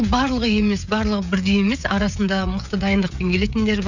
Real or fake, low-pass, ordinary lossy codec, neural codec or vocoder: fake; 7.2 kHz; none; codec, 16 kHz, 16 kbps, FreqCodec, smaller model